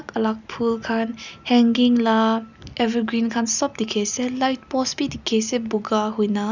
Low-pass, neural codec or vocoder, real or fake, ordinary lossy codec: 7.2 kHz; none; real; none